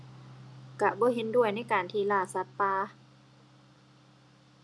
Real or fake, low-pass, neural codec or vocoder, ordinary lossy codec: real; none; none; none